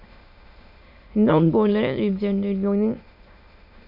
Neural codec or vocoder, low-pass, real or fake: autoencoder, 22.05 kHz, a latent of 192 numbers a frame, VITS, trained on many speakers; 5.4 kHz; fake